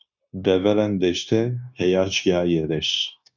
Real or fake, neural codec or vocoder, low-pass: fake; codec, 16 kHz, 0.9 kbps, LongCat-Audio-Codec; 7.2 kHz